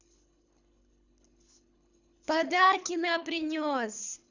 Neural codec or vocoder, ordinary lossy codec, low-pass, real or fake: codec, 24 kHz, 3 kbps, HILCodec; none; 7.2 kHz; fake